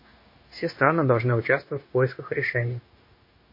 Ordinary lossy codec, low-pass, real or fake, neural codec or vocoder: MP3, 24 kbps; 5.4 kHz; fake; codec, 16 kHz, 0.9 kbps, LongCat-Audio-Codec